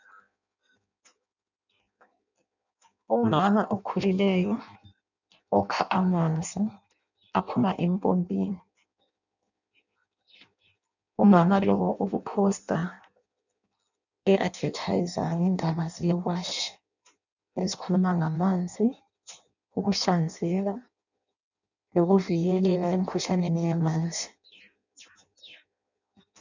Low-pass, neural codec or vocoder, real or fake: 7.2 kHz; codec, 16 kHz in and 24 kHz out, 0.6 kbps, FireRedTTS-2 codec; fake